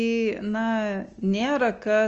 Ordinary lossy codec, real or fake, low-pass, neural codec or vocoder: Opus, 24 kbps; real; 7.2 kHz; none